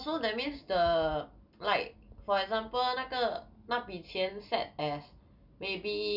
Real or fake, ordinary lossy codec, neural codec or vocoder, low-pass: real; none; none; 5.4 kHz